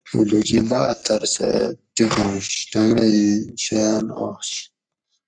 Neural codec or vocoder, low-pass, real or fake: codec, 44.1 kHz, 3.4 kbps, Pupu-Codec; 9.9 kHz; fake